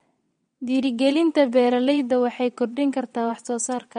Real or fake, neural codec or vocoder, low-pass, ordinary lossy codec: fake; vocoder, 22.05 kHz, 80 mel bands, WaveNeXt; 9.9 kHz; MP3, 48 kbps